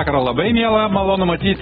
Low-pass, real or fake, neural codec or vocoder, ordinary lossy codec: 19.8 kHz; real; none; AAC, 16 kbps